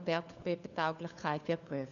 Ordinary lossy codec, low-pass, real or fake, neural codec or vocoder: none; 7.2 kHz; fake; codec, 16 kHz, 4 kbps, FunCodec, trained on LibriTTS, 50 frames a second